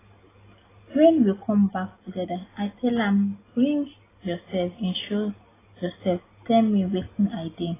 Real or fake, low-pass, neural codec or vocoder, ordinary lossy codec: real; 3.6 kHz; none; AAC, 16 kbps